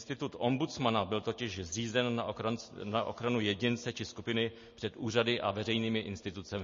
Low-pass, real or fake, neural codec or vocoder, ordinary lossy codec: 7.2 kHz; real; none; MP3, 32 kbps